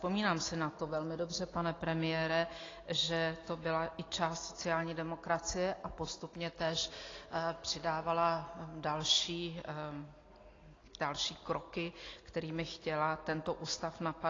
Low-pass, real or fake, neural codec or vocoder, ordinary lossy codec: 7.2 kHz; real; none; AAC, 32 kbps